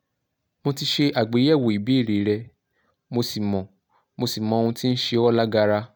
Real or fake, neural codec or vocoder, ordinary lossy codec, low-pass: real; none; none; none